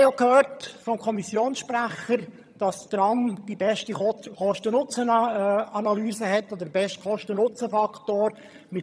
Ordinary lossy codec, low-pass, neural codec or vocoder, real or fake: none; none; vocoder, 22.05 kHz, 80 mel bands, HiFi-GAN; fake